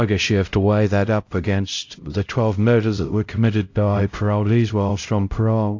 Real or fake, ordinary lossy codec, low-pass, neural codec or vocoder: fake; AAC, 48 kbps; 7.2 kHz; codec, 16 kHz, 0.5 kbps, X-Codec, HuBERT features, trained on LibriSpeech